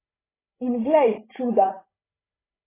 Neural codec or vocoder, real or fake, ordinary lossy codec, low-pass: codec, 16 kHz, 16 kbps, FreqCodec, larger model; fake; AAC, 16 kbps; 3.6 kHz